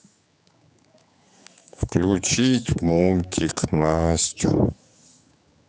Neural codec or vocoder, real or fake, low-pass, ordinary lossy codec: codec, 16 kHz, 4 kbps, X-Codec, HuBERT features, trained on general audio; fake; none; none